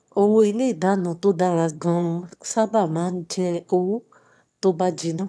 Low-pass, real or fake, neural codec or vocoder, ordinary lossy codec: none; fake; autoencoder, 22.05 kHz, a latent of 192 numbers a frame, VITS, trained on one speaker; none